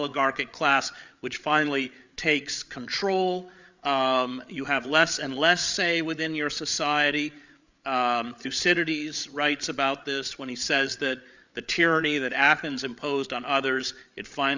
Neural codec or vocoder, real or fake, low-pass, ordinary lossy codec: codec, 16 kHz, 8 kbps, FreqCodec, larger model; fake; 7.2 kHz; Opus, 64 kbps